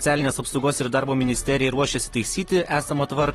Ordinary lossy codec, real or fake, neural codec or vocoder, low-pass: AAC, 32 kbps; fake; vocoder, 44.1 kHz, 128 mel bands, Pupu-Vocoder; 19.8 kHz